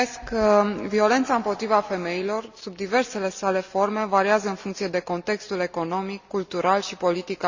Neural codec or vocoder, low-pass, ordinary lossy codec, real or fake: none; 7.2 kHz; Opus, 64 kbps; real